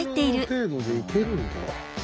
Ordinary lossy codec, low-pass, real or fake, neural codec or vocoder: none; none; real; none